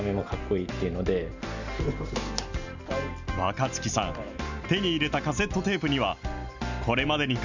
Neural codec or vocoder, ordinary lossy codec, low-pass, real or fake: none; none; 7.2 kHz; real